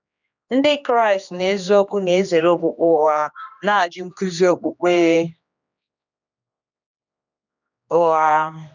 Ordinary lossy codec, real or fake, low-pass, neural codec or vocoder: none; fake; 7.2 kHz; codec, 16 kHz, 2 kbps, X-Codec, HuBERT features, trained on general audio